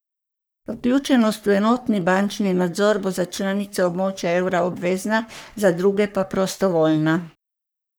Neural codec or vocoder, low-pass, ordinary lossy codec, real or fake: codec, 44.1 kHz, 3.4 kbps, Pupu-Codec; none; none; fake